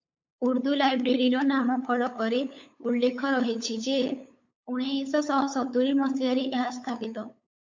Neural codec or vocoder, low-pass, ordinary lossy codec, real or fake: codec, 16 kHz, 8 kbps, FunCodec, trained on LibriTTS, 25 frames a second; 7.2 kHz; MP3, 48 kbps; fake